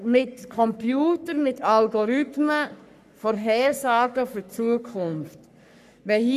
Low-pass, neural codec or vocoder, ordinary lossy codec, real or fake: 14.4 kHz; codec, 44.1 kHz, 3.4 kbps, Pupu-Codec; none; fake